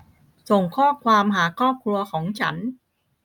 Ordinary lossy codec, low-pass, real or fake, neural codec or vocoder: none; 19.8 kHz; real; none